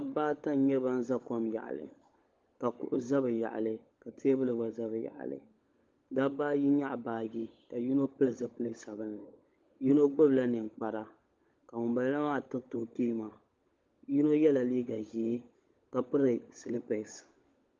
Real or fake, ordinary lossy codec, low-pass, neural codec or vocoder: fake; Opus, 32 kbps; 7.2 kHz; codec, 16 kHz, 16 kbps, FunCodec, trained on LibriTTS, 50 frames a second